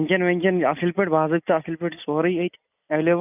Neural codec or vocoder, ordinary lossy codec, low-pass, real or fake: none; none; 3.6 kHz; real